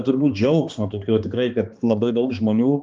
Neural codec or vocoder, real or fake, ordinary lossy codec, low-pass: codec, 16 kHz, 2 kbps, X-Codec, HuBERT features, trained on balanced general audio; fake; Opus, 24 kbps; 7.2 kHz